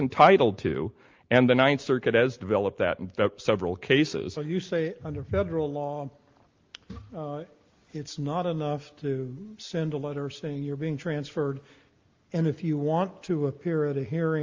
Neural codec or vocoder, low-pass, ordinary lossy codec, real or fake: none; 7.2 kHz; Opus, 32 kbps; real